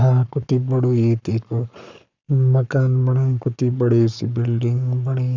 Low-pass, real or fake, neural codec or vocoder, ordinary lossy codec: 7.2 kHz; fake; codec, 44.1 kHz, 7.8 kbps, Pupu-Codec; none